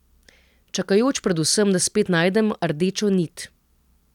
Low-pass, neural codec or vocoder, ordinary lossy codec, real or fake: 19.8 kHz; none; none; real